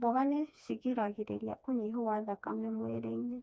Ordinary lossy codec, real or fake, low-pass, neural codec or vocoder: none; fake; none; codec, 16 kHz, 2 kbps, FreqCodec, smaller model